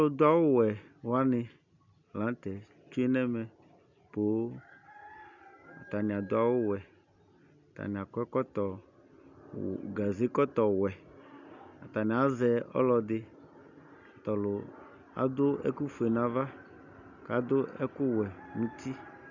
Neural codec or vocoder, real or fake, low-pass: none; real; 7.2 kHz